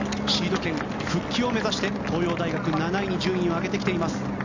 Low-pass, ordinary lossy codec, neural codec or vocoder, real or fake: 7.2 kHz; MP3, 64 kbps; none; real